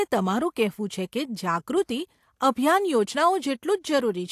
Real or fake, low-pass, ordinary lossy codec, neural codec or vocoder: real; 14.4 kHz; AAC, 64 kbps; none